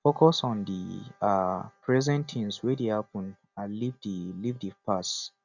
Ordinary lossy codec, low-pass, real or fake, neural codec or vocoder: none; 7.2 kHz; real; none